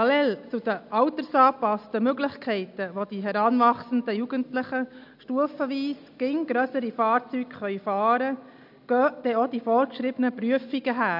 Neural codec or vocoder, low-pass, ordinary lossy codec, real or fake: none; 5.4 kHz; MP3, 48 kbps; real